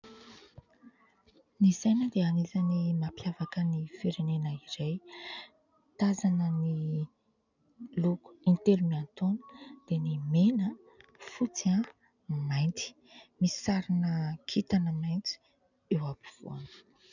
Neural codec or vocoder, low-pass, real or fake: none; 7.2 kHz; real